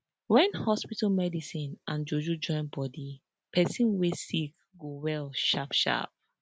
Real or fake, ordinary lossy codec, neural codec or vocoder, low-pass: real; none; none; none